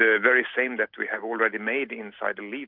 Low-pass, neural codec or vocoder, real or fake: 5.4 kHz; none; real